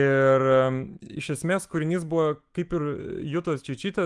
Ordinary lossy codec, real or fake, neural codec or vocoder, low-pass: Opus, 24 kbps; fake; autoencoder, 48 kHz, 128 numbers a frame, DAC-VAE, trained on Japanese speech; 10.8 kHz